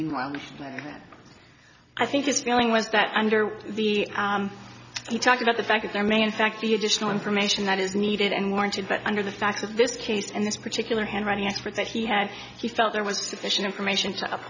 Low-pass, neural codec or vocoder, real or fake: 7.2 kHz; none; real